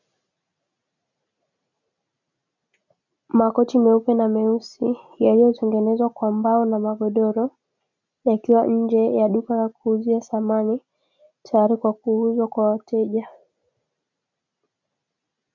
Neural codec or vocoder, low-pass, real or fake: none; 7.2 kHz; real